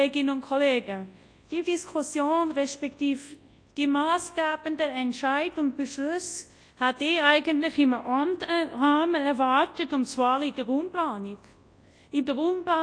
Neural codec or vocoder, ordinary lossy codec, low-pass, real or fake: codec, 24 kHz, 0.9 kbps, WavTokenizer, large speech release; AAC, 48 kbps; 9.9 kHz; fake